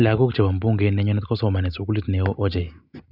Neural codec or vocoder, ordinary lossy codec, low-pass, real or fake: none; none; 5.4 kHz; real